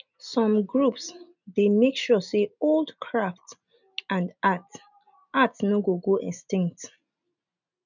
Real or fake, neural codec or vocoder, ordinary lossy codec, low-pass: real; none; none; 7.2 kHz